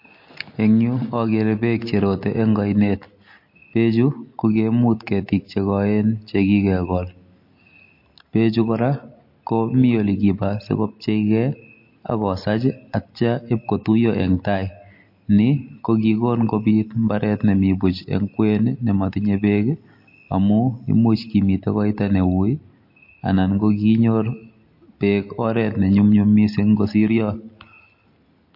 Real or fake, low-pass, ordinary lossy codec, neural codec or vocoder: real; 5.4 kHz; MP3, 32 kbps; none